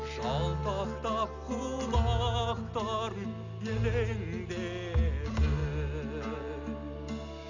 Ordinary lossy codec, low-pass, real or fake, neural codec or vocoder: none; 7.2 kHz; real; none